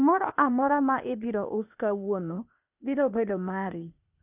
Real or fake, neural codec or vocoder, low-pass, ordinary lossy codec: fake; codec, 16 kHz, 0.8 kbps, ZipCodec; 3.6 kHz; none